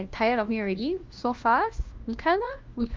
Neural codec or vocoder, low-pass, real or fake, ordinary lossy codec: codec, 16 kHz, 1 kbps, FunCodec, trained on LibriTTS, 50 frames a second; 7.2 kHz; fake; Opus, 32 kbps